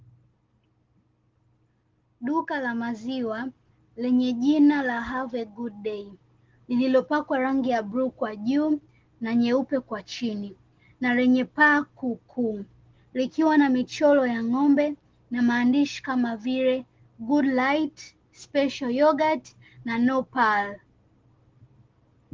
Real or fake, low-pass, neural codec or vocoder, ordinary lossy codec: real; 7.2 kHz; none; Opus, 16 kbps